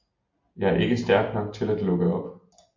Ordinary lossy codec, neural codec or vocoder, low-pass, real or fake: MP3, 48 kbps; none; 7.2 kHz; real